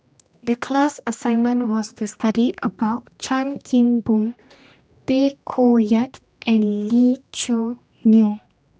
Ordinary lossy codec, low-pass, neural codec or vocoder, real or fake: none; none; codec, 16 kHz, 1 kbps, X-Codec, HuBERT features, trained on general audio; fake